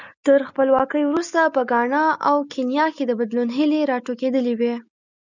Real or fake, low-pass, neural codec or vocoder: real; 7.2 kHz; none